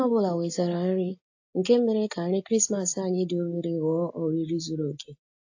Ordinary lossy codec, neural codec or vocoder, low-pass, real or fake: AAC, 48 kbps; none; 7.2 kHz; real